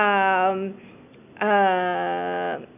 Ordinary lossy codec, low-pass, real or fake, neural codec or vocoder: none; 3.6 kHz; real; none